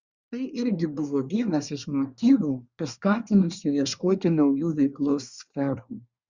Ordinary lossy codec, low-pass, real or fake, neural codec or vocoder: Opus, 64 kbps; 7.2 kHz; fake; codec, 24 kHz, 1 kbps, SNAC